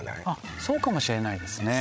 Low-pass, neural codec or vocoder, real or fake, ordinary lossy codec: none; codec, 16 kHz, 16 kbps, FreqCodec, larger model; fake; none